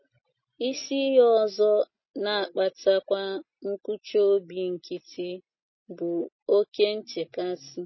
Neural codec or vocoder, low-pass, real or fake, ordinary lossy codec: none; 7.2 kHz; real; MP3, 24 kbps